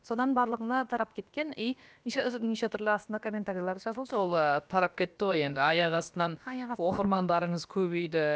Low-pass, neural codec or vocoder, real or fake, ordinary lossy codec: none; codec, 16 kHz, about 1 kbps, DyCAST, with the encoder's durations; fake; none